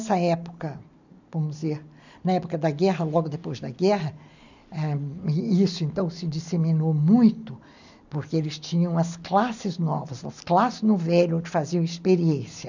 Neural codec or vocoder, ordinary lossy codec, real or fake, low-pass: none; none; real; 7.2 kHz